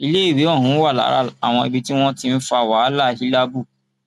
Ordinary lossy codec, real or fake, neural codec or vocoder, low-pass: none; real; none; 14.4 kHz